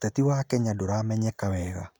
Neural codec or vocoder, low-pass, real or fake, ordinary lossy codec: none; none; real; none